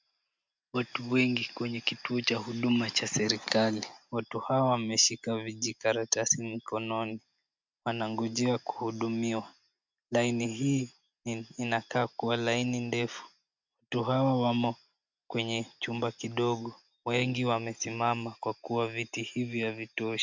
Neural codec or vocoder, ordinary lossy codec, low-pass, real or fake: none; MP3, 64 kbps; 7.2 kHz; real